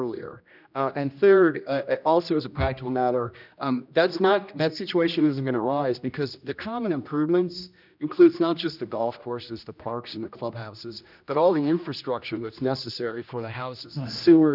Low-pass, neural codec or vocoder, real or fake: 5.4 kHz; codec, 16 kHz, 1 kbps, X-Codec, HuBERT features, trained on general audio; fake